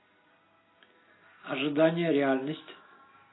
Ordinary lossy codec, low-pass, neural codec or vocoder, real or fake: AAC, 16 kbps; 7.2 kHz; none; real